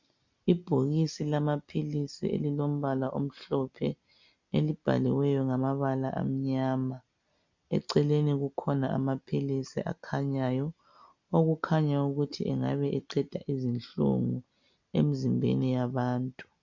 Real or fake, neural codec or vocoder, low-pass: real; none; 7.2 kHz